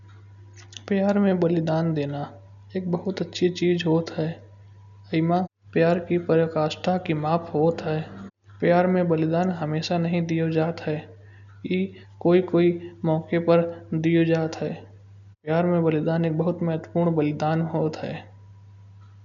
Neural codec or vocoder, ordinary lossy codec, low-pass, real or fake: none; none; 7.2 kHz; real